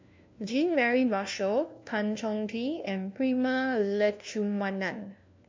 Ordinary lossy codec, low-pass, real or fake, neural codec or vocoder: MP3, 64 kbps; 7.2 kHz; fake; codec, 16 kHz, 1 kbps, FunCodec, trained on LibriTTS, 50 frames a second